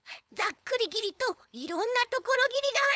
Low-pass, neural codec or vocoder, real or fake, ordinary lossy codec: none; codec, 16 kHz, 4.8 kbps, FACodec; fake; none